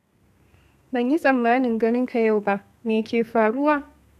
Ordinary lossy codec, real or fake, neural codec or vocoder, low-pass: none; fake; codec, 32 kHz, 1.9 kbps, SNAC; 14.4 kHz